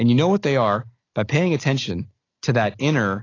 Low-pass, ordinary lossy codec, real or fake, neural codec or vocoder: 7.2 kHz; AAC, 32 kbps; real; none